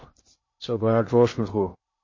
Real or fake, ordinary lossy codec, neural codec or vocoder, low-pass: fake; MP3, 32 kbps; codec, 16 kHz in and 24 kHz out, 0.6 kbps, FocalCodec, streaming, 2048 codes; 7.2 kHz